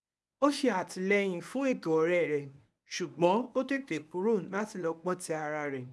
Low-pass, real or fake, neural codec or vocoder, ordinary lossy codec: none; fake; codec, 24 kHz, 0.9 kbps, WavTokenizer, medium speech release version 1; none